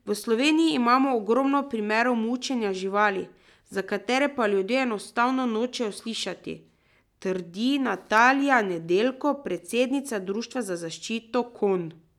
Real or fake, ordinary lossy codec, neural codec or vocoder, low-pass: real; none; none; 19.8 kHz